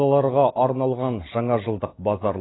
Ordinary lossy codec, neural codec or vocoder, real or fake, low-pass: AAC, 16 kbps; none; real; 7.2 kHz